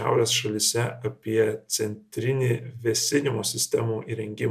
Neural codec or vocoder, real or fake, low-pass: none; real; 14.4 kHz